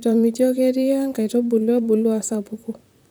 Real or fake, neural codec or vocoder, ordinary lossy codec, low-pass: fake; vocoder, 44.1 kHz, 128 mel bands, Pupu-Vocoder; none; none